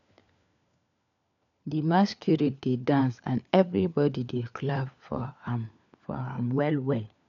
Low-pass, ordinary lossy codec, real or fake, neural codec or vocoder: 7.2 kHz; none; fake; codec, 16 kHz, 4 kbps, FunCodec, trained on LibriTTS, 50 frames a second